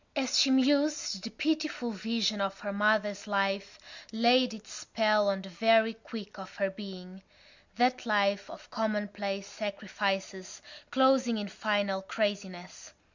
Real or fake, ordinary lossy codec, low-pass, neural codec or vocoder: real; Opus, 64 kbps; 7.2 kHz; none